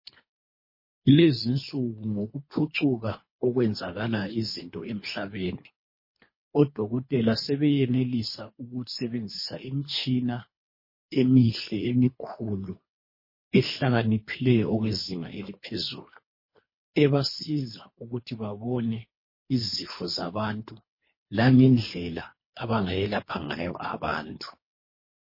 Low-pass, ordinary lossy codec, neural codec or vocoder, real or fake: 5.4 kHz; MP3, 24 kbps; codec, 24 kHz, 3 kbps, HILCodec; fake